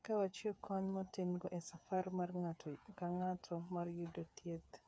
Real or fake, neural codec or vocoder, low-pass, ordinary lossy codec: fake; codec, 16 kHz, 8 kbps, FreqCodec, smaller model; none; none